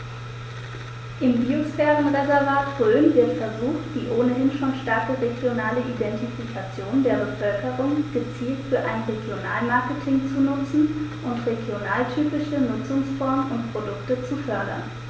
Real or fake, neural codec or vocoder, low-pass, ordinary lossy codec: real; none; none; none